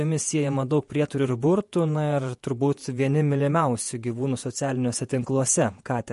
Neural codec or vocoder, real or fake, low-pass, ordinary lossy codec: vocoder, 48 kHz, 128 mel bands, Vocos; fake; 14.4 kHz; MP3, 48 kbps